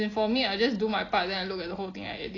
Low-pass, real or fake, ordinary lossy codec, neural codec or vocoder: 7.2 kHz; real; AAC, 32 kbps; none